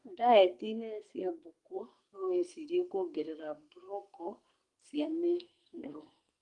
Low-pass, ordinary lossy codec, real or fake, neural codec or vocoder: 10.8 kHz; Opus, 32 kbps; fake; codec, 44.1 kHz, 2.6 kbps, SNAC